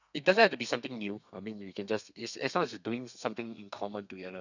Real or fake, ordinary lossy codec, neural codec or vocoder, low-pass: fake; none; codec, 32 kHz, 1.9 kbps, SNAC; 7.2 kHz